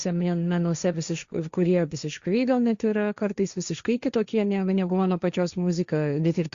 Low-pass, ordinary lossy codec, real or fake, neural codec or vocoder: 7.2 kHz; Opus, 64 kbps; fake; codec, 16 kHz, 1.1 kbps, Voila-Tokenizer